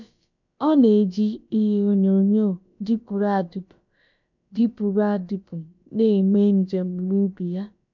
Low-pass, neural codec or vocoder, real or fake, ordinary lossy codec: 7.2 kHz; codec, 16 kHz, about 1 kbps, DyCAST, with the encoder's durations; fake; none